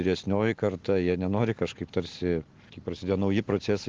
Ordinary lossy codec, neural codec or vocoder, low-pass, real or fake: Opus, 16 kbps; none; 7.2 kHz; real